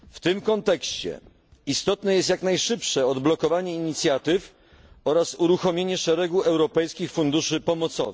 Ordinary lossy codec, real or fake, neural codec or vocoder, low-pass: none; real; none; none